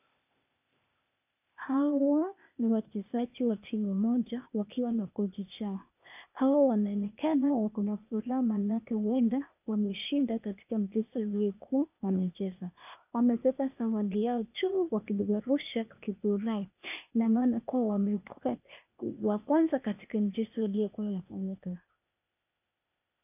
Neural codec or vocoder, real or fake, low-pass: codec, 16 kHz, 0.8 kbps, ZipCodec; fake; 3.6 kHz